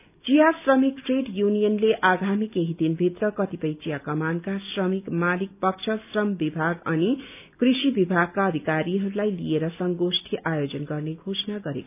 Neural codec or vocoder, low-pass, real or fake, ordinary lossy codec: none; 3.6 kHz; real; none